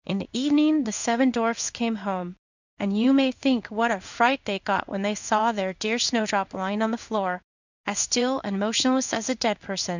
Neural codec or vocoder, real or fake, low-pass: codec, 16 kHz in and 24 kHz out, 1 kbps, XY-Tokenizer; fake; 7.2 kHz